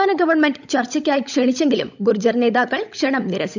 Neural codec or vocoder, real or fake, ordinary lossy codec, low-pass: codec, 16 kHz, 16 kbps, FunCodec, trained on LibriTTS, 50 frames a second; fake; none; 7.2 kHz